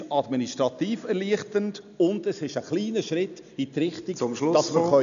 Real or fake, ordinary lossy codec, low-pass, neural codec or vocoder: real; none; 7.2 kHz; none